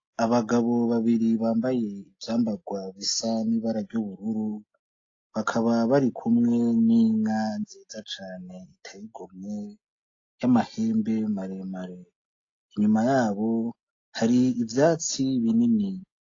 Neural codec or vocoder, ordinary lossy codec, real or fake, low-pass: none; AAC, 32 kbps; real; 7.2 kHz